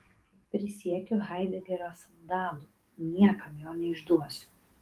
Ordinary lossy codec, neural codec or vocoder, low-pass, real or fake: Opus, 24 kbps; codec, 44.1 kHz, 7.8 kbps, DAC; 14.4 kHz; fake